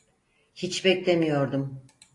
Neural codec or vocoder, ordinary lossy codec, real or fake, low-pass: none; AAC, 48 kbps; real; 10.8 kHz